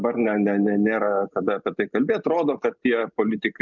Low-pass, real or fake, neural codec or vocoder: 7.2 kHz; real; none